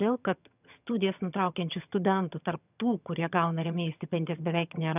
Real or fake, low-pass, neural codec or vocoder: fake; 3.6 kHz; vocoder, 22.05 kHz, 80 mel bands, HiFi-GAN